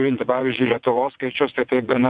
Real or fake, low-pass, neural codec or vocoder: fake; 9.9 kHz; vocoder, 22.05 kHz, 80 mel bands, WaveNeXt